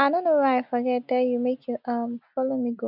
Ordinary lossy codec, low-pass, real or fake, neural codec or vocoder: none; 5.4 kHz; real; none